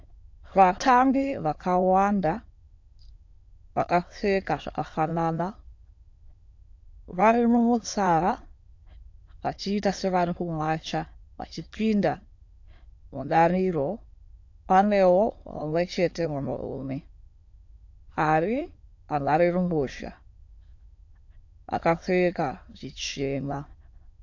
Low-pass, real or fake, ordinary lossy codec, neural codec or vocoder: 7.2 kHz; fake; AAC, 48 kbps; autoencoder, 22.05 kHz, a latent of 192 numbers a frame, VITS, trained on many speakers